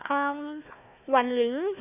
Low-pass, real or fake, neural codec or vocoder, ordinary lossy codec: 3.6 kHz; fake; codec, 16 kHz, 1 kbps, FunCodec, trained on Chinese and English, 50 frames a second; none